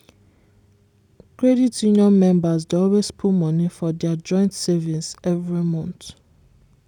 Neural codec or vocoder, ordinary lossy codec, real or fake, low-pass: vocoder, 44.1 kHz, 128 mel bands every 256 samples, BigVGAN v2; none; fake; 19.8 kHz